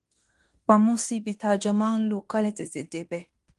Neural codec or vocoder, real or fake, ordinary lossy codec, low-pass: codec, 16 kHz in and 24 kHz out, 0.9 kbps, LongCat-Audio-Codec, fine tuned four codebook decoder; fake; Opus, 24 kbps; 10.8 kHz